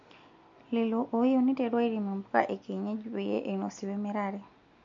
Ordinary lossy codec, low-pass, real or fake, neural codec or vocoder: MP3, 48 kbps; 7.2 kHz; real; none